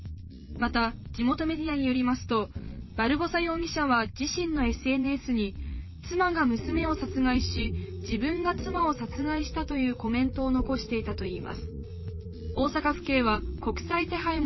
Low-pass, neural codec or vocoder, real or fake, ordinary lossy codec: 7.2 kHz; vocoder, 22.05 kHz, 80 mel bands, Vocos; fake; MP3, 24 kbps